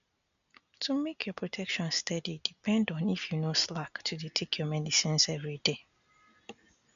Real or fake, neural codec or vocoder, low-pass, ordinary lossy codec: real; none; 7.2 kHz; none